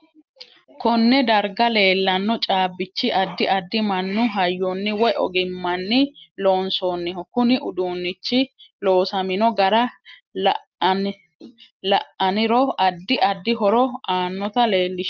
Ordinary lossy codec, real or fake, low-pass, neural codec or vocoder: Opus, 24 kbps; real; 7.2 kHz; none